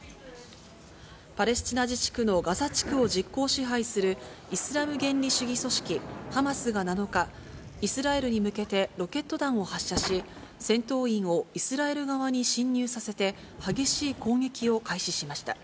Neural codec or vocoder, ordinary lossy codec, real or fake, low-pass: none; none; real; none